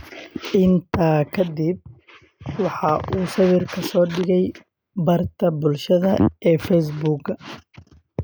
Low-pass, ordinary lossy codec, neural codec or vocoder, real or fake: none; none; none; real